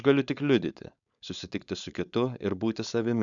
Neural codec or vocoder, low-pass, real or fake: codec, 16 kHz, 4.8 kbps, FACodec; 7.2 kHz; fake